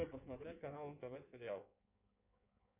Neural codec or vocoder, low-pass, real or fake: codec, 16 kHz in and 24 kHz out, 1.1 kbps, FireRedTTS-2 codec; 3.6 kHz; fake